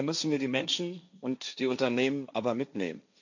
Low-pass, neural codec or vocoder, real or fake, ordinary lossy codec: 7.2 kHz; codec, 16 kHz, 1.1 kbps, Voila-Tokenizer; fake; none